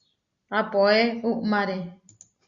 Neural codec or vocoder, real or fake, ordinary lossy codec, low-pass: none; real; Opus, 64 kbps; 7.2 kHz